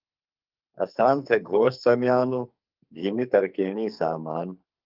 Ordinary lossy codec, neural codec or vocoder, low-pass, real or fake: Opus, 24 kbps; codec, 44.1 kHz, 2.6 kbps, SNAC; 5.4 kHz; fake